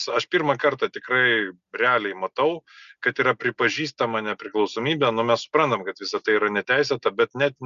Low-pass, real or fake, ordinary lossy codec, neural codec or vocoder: 7.2 kHz; real; Opus, 64 kbps; none